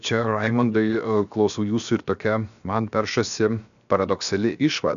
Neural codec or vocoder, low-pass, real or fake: codec, 16 kHz, 0.7 kbps, FocalCodec; 7.2 kHz; fake